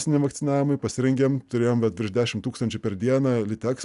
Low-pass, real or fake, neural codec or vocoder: 10.8 kHz; real; none